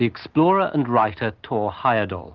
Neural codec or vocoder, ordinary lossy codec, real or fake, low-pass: none; Opus, 32 kbps; real; 7.2 kHz